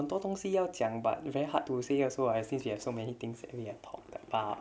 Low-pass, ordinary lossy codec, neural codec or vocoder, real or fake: none; none; none; real